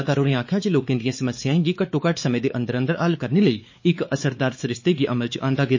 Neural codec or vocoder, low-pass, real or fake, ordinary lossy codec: codec, 16 kHz, 4 kbps, X-Codec, WavLM features, trained on Multilingual LibriSpeech; 7.2 kHz; fake; MP3, 32 kbps